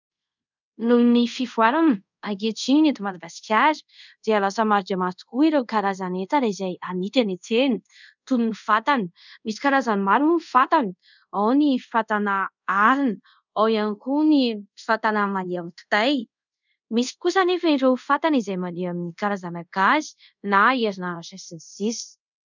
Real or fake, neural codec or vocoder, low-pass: fake; codec, 24 kHz, 0.5 kbps, DualCodec; 7.2 kHz